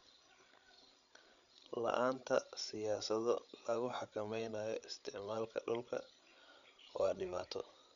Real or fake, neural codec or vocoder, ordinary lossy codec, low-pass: fake; codec, 16 kHz, 8 kbps, FreqCodec, larger model; none; 7.2 kHz